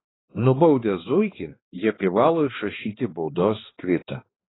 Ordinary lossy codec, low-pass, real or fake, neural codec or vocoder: AAC, 16 kbps; 7.2 kHz; fake; codec, 16 kHz, 2 kbps, X-Codec, HuBERT features, trained on balanced general audio